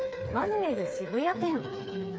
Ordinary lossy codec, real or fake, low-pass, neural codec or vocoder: none; fake; none; codec, 16 kHz, 4 kbps, FreqCodec, smaller model